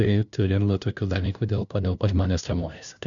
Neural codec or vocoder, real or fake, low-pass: codec, 16 kHz, 0.5 kbps, FunCodec, trained on LibriTTS, 25 frames a second; fake; 7.2 kHz